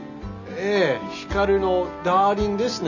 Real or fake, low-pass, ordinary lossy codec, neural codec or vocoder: real; 7.2 kHz; none; none